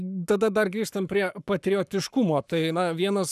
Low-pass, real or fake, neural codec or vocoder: 14.4 kHz; fake; codec, 44.1 kHz, 7.8 kbps, Pupu-Codec